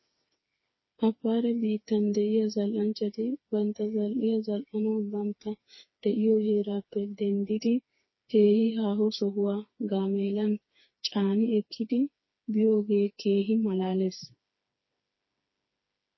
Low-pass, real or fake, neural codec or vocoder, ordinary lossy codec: 7.2 kHz; fake; codec, 16 kHz, 4 kbps, FreqCodec, smaller model; MP3, 24 kbps